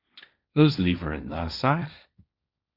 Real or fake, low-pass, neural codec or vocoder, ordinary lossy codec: fake; 5.4 kHz; codec, 16 kHz, 1.1 kbps, Voila-Tokenizer; Opus, 64 kbps